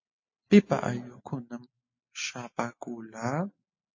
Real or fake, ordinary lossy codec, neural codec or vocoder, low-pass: real; MP3, 32 kbps; none; 7.2 kHz